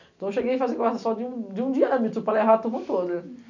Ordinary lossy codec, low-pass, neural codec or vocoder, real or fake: none; 7.2 kHz; none; real